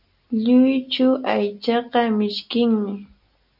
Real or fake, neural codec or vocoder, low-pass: real; none; 5.4 kHz